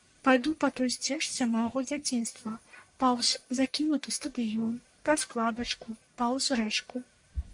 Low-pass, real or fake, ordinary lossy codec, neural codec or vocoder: 10.8 kHz; fake; MP3, 64 kbps; codec, 44.1 kHz, 1.7 kbps, Pupu-Codec